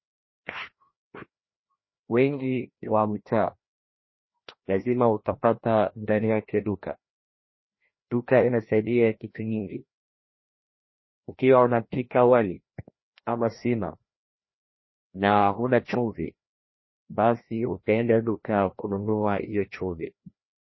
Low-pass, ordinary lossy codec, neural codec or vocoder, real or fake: 7.2 kHz; MP3, 24 kbps; codec, 16 kHz, 1 kbps, FreqCodec, larger model; fake